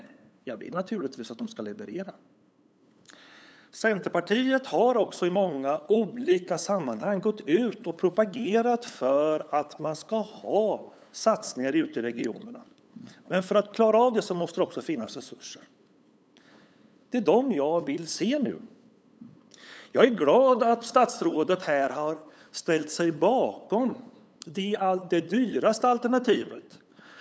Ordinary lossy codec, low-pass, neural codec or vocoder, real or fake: none; none; codec, 16 kHz, 8 kbps, FunCodec, trained on LibriTTS, 25 frames a second; fake